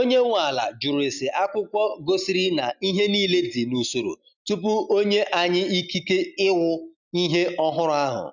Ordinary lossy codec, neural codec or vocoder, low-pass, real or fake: none; none; 7.2 kHz; real